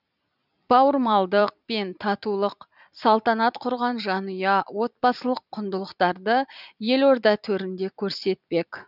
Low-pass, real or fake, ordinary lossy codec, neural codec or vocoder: 5.4 kHz; fake; none; vocoder, 44.1 kHz, 128 mel bands every 256 samples, BigVGAN v2